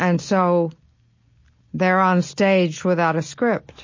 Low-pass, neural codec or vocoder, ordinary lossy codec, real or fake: 7.2 kHz; none; MP3, 32 kbps; real